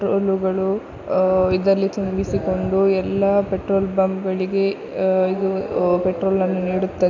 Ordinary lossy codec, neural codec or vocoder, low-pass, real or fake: none; none; 7.2 kHz; real